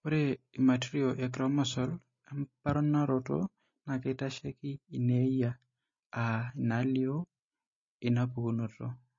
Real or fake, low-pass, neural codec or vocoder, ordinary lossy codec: real; 7.2 kHz; none; MP3, 32 kbps